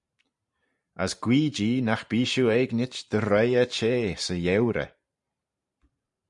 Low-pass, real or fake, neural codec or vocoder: 10.8 kHz; fake; vocoder, 44.1 kHz, 128 mel bands every 512 samples, BigVGAN v2